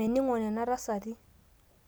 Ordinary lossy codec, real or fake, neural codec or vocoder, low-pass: none; real; none; none